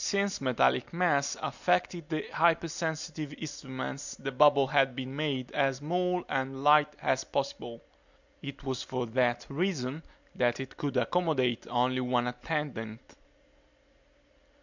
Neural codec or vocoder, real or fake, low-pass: none; real; 7.2 kHz